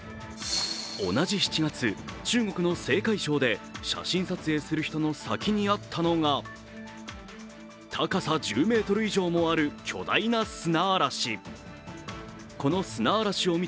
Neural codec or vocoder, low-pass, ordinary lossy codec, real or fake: none; none; none; real